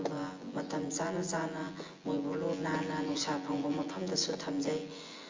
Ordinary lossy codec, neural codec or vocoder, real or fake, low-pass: Opus, 32 kbps; vocoder, 24 kHz, 100 mel bands, Vocos; fake; 7.2 kHz